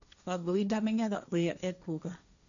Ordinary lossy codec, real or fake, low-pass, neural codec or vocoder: none; fake; 7.2 kHz; codec, 16 kHz, 1.1 kbps, Voila-Tokenizer